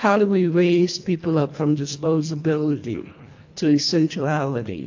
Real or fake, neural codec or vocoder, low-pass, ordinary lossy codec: fake; codec, 24 kHz, 1.5 kbps, HILCodec; 7.2 kHz; AAC, 48 kbps